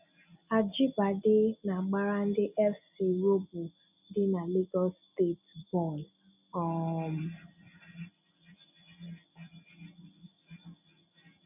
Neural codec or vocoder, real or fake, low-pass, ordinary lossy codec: none; real; 3.6 kHz; none